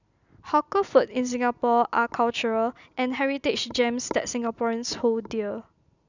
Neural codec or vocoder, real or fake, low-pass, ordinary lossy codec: none; real; 7.2 kHz; none